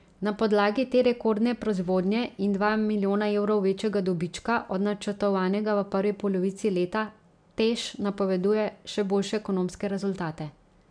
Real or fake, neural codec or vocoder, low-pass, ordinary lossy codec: real; none; 9.9 kHz; none